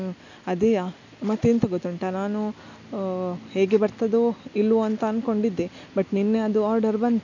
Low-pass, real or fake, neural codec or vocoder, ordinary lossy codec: 7.2 kHz; real; none; none